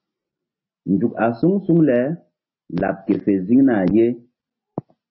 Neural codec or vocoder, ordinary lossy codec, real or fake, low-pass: none; MP3, 24 kbps; real; 7.2 kHz